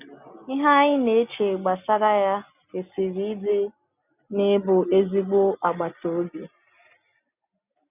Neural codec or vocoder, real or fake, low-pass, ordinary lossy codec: none; real; 3.6 kHz; none